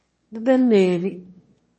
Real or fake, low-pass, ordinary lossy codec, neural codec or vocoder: fake; 9.9 kHz; MP3, 32 kbps; autoencoder, 22.05 kHz, a latent of 192 numbers a frame, VITS, trained on one speaker